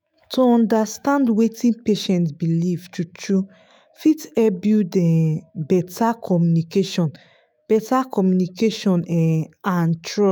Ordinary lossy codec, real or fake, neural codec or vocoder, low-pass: none; fake; autoencoder, 48 kHz, 128 numbers a frame, DAC-VAE, trained on Japanese speech; none